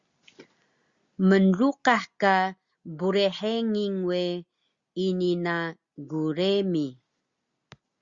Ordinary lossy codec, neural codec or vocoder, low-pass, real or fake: Opus, 64 kbps; none; 7.2 kHz; real